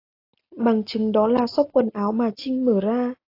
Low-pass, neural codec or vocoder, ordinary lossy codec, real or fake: 5.4 kHz; none; AAC, 32 kbps; real